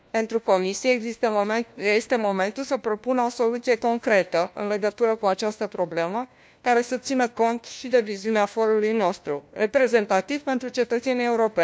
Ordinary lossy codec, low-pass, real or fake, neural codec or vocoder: none; none; fake; codec, 16 kHz, 1 kbps, FunCodec, trained on LibriTTS, 50 frames a second